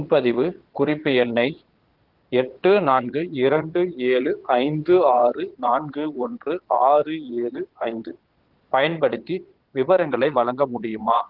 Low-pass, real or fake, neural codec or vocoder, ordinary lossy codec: 5.4 kHz; fake; vocoder, 22.05 kHz, 80 mel bands, Vocos; Opus, 16 kbps